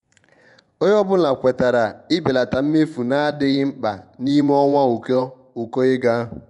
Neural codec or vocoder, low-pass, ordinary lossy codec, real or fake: vocoder, 24 kHz, 100 mel bands, Vocos; 10.8 kHz; none; fake